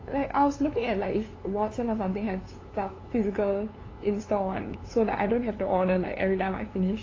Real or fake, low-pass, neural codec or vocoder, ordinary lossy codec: fake; 7.2 kHz; codec, 16 kHz, 2 kbps, FunCodec, trained on LibriTTS, 25 frames a second; AAC, 32 kbps